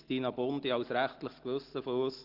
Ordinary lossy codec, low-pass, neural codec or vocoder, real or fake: Opus, 16 kbps; 5.4 kHz; none; real